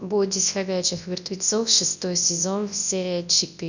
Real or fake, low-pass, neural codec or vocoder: fake; 7.2 kHz; codec, 24 kHz, 0.9 kbps, WavTokenizer, large speech release